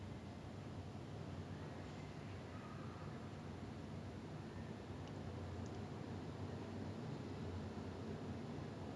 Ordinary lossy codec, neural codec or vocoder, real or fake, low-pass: none; none; real; none